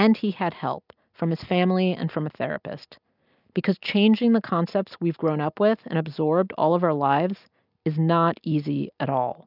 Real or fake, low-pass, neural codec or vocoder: real; 5.4 kHz; none